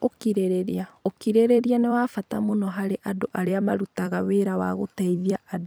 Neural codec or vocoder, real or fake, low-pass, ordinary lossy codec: vocoder, 44.1 kHz, 128 mel bands every 256 samples, BigVGAN v2; fake; none; none